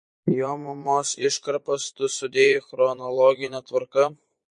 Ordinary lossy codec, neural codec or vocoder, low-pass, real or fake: MP3, 48 kbps; vocoder, 22.05 kHz, 80 mel bands, Vocos; 9.9 kHz; fake